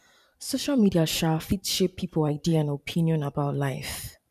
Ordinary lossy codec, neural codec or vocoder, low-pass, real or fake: none; vocoder, 44.1 kHz, 128 mel bands every 512 samples, BigVGAN v2; 14.4 kHz; fake